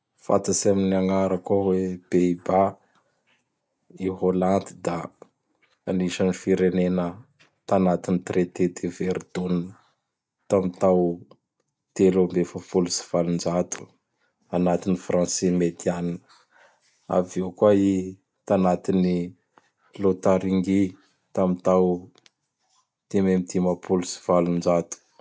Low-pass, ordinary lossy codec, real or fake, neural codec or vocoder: none; none; real; none